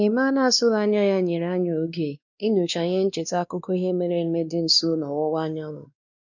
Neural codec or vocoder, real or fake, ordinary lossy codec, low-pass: codec, 16 kHz, 2 kbps, X-Codec, WavLM features, trained on Multilingual LibriSpeech; fake; none; 7.2 kHz